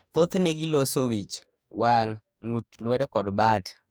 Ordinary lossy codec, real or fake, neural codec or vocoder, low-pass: none; fake; codec, 44.1 kHz, 2.6 kbps, DAC; none